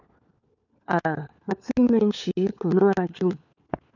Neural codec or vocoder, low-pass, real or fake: codec, 16 kHz, 4 kbps, FunCodec, trained on LibriTTS, 50 frames a second; 7.2 kHz; fake